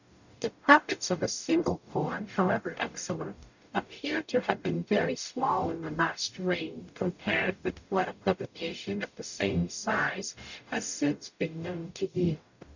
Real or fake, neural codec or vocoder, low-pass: fake; codec, 44.1 kHz, 0.9 kbps, DAC; 7.2 kHz